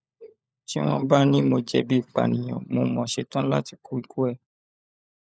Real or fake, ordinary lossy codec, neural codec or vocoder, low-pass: fake; none; codec, 16 kHz, 16 kbps, FunCodec, trained on LibriTTS, 50 frames a second; none